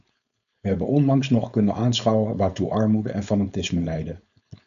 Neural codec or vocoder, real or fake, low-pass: codec, 16 kHz, 4.8 kbps, FACodec; fake; 7.2 kHz